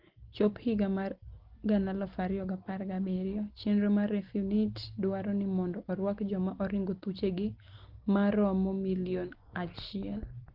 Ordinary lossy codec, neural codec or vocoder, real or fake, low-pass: Opus, 16 kbps; none; real; 5.4 kHz